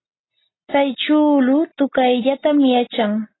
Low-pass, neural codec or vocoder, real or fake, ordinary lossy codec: 7.2 kHz; none; real; AAC, 16 kbps